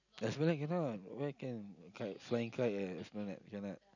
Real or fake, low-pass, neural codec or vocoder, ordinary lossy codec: real; 7.2 kHz; none; none